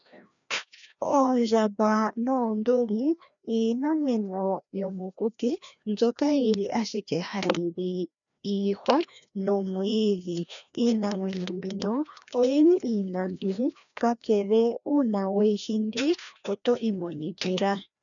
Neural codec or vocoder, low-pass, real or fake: codec, 16 kHz, 1 kbps, FreqCodec, larger model; 7.2 kHz; fake